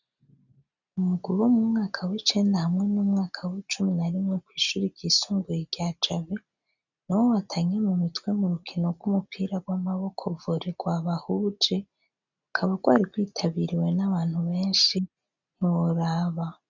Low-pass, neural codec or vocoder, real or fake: 7.2 kHz; none; real